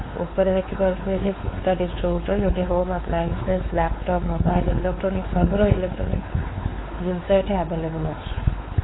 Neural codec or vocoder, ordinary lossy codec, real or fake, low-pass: codec, 16 kHz, 4 kbps, X-Codec, WavLM features, trained on Multilingual LibriSpeech; AAC, 16 kbps; fake; 7.2 kHz